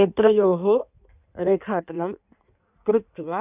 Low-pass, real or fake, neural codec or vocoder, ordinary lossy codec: 3.6 kHz; fake; codec, 16 kHz in and 24 kHz out, 1.1 kbps, FireRedTTS-2 codec; none